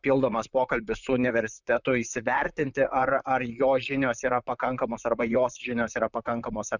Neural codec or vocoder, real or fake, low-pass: vocoder, 44.1 kHz, 128 mel bands, Pupu-Vocoder; fake; 7.2 kHz